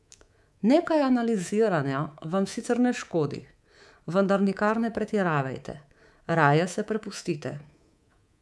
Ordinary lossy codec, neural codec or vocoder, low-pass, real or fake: none; codec, 24 kHz, 3.1 kbps, DualCodec; none; fake